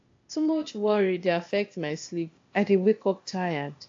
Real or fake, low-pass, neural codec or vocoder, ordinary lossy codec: fake; 7.2 kHz; codec, 16 kHz, 0.8 kbps, ZipCodec; AAC, 48 kbps